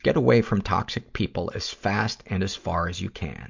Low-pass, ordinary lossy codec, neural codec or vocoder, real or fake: 7.2 kHz; MP3, 64 kbps; none; real